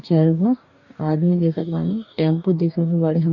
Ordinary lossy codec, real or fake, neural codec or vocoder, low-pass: none; fake; codec, 44.1 kHz, 2.6 kbps, DAC; 7.2 kHz